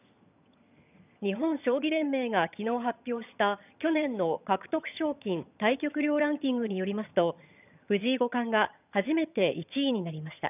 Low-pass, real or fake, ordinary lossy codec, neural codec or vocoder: 3.6 kHz; fake; none; vocoder, 22.05 kHz, 80 mel bands, HiFi-GAN